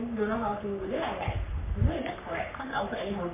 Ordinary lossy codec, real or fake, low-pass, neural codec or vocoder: MP3, 24 kbps; fake; 3.6 kHz; codec, 44.1 kHz, 3.4 kbps, Pupu-Codec